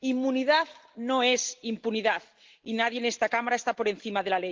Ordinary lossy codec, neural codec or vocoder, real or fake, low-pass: Opus, 16 kbps; none; real; 7.2 kHz